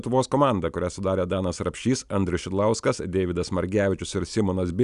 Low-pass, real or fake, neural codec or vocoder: 10.8 kHz; real; none